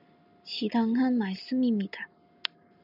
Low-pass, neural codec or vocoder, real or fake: 5.4 kHz; none; real